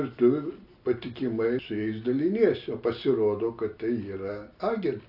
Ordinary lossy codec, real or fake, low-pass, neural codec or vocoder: AAC, 48 kbps; real; 5.4 kHz; none